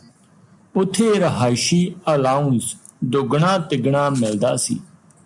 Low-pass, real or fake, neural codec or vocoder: 10.8 kHz; real; none